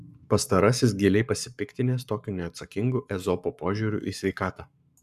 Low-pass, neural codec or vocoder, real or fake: 14.4 kHz; codec, 44.1 kHz, 7.8 kbps, Pupu-Codec; fake